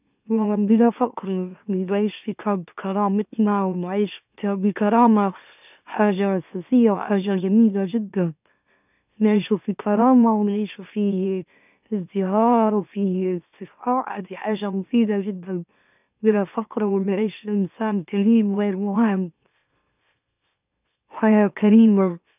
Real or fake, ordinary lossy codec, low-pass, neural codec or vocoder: fake; none; 3.6 kHz; autoencoder, 44.1 kHz, a latent of 192 numbers a frame, MeloTTS